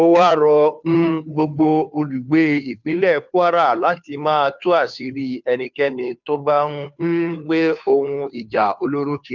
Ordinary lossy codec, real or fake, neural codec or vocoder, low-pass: none; fake; codec, 16 kHz, 2 kbps, FunCodec, trained on Chinese and English, 25 frames a second; 7.2 kHz